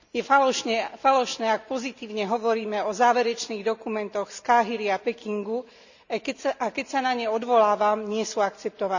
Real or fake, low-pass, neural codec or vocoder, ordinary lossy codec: real; 7.2 kHz; none; none